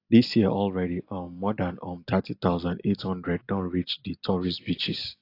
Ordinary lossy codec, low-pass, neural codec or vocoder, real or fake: AAC, 32 kbps; 5.4 kHz; none; real